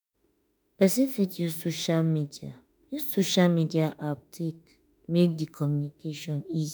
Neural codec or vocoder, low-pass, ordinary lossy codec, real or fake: autoencoder, 48 kHz, 32 numbers a frame, DAC-VAE, trained on Japanese speech; none; none; fake